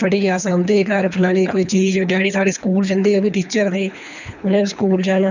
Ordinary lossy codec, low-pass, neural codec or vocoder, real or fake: none; 7.2 kHz; codec, 24 kHz, 3 kbps, HILCodec; fake